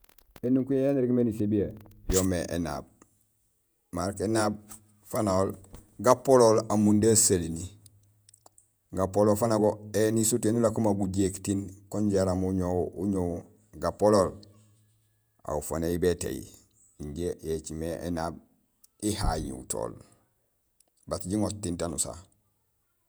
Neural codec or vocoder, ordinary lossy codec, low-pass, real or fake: vocoder, 48 kHz, 128 mel bands, Vocos; none; none; fake